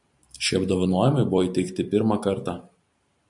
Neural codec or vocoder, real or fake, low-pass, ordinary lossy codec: vocoder, 44.1 kHz, 128 mel bands every 512 samples, BigVGAN v2; fake; 10.8 kHz; MP3, 96 kbps